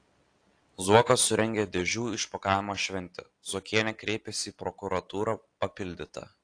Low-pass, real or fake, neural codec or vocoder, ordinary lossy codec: 9.9 kHz; real; none; AAC, 48 kbps